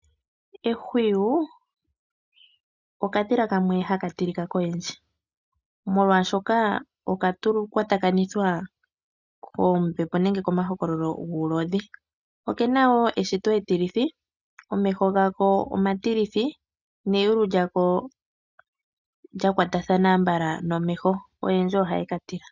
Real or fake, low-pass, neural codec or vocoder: real; 7.2 kHz; none